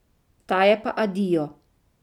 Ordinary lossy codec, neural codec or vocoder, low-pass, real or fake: none; none; 19.8 kHz; real